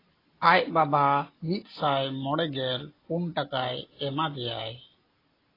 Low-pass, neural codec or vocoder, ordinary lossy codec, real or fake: 5.4 kHz; none; AAC, 24 kbps; real